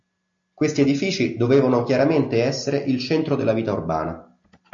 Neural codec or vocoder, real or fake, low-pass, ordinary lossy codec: none; real; 7.2 kHz; MP3, 48 kbps